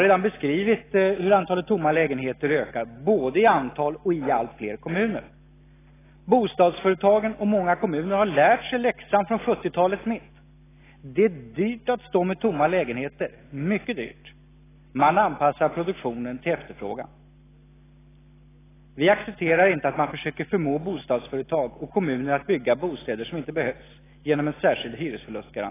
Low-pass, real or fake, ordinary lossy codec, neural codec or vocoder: 3.6 kHz; real; AAC, 16 kbps; none